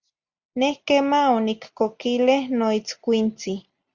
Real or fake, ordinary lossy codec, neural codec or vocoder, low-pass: real; Opus, 64 kbps; none; 7.2 kHz